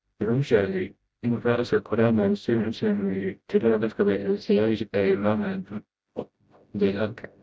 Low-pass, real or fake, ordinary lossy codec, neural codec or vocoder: none; fake; none; codec, 16 kHz, 0.5 kbps, FreqCodec, smaller model